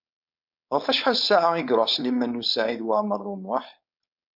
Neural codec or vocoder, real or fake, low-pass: codec, 16 kHz, 4.8 kbps, FACodec; fake; 5.4 kHz